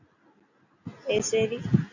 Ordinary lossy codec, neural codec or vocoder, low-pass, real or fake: AAC, 48 kbps; none; 7.2 kHz; real